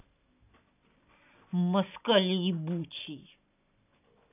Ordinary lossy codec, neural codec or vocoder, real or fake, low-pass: none; none; real; 3.6 kHz